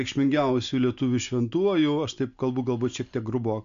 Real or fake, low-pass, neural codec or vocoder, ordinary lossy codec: real; 7.2 kHz; none; MP3, 64 kbps